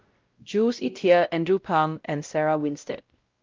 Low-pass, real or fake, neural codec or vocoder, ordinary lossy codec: 7.2 kHz; fake; codec, 16 kHz, 0.5 kbps, X-Codec, WavLM features, trained on Multilingual LibriSpeech; Opus, 32 kbps